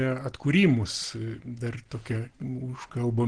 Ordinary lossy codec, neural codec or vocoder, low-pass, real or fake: Opus, 16 kbps; none; 9.9 kHz; real